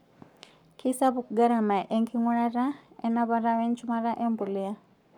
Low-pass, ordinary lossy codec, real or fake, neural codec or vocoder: 19.8 kHz; none; fake; codec, 44.1 kHz, 7.8 kbps, Pupu-Codec